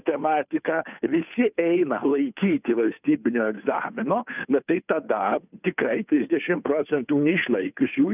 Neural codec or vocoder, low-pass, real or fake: codec, 16 kHz, 2 kbps, FunCodec, trained on Chinese and English, 25 frames a second; 3.6 kHz; fake